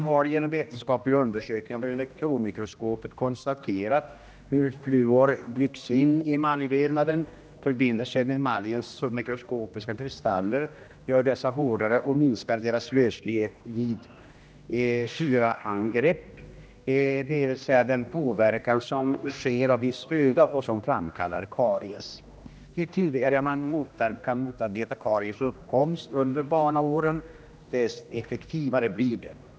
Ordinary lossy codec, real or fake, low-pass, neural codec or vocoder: none; fake; none; codec, 16 kHz, 1 kbps, X-Codec, HuBERT features, trained on general audio